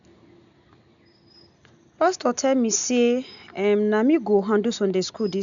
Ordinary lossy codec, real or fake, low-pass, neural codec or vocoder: MP3, 96 kbps; real; 7.2 kHz; none